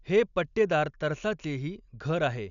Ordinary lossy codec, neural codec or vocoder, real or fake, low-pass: none; none; real; 7.2 kHz